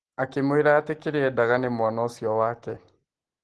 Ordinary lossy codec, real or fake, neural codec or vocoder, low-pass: Opus, 16 kbps; real; none; 10.8 kHz